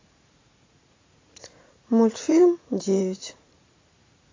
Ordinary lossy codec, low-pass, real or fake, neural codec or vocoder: AAC, 32 kbps; 7.2 kHz; fake; vocoder, 44.1 kHz, 80 mel bands, Vocos